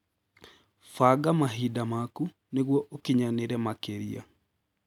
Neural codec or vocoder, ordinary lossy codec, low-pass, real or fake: none; none; 19.8 kHz; real